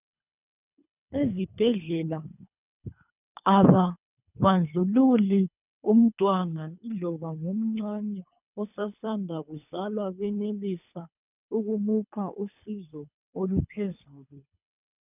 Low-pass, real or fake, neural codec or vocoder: 3.6 kHz; fake; codec, 24 kHz, 3 kbps, HILCodec